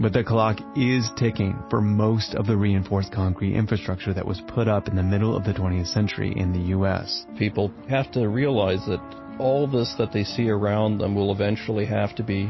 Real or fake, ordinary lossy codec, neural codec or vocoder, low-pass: real; MP3, 24 kbps; none; 7.2 kHz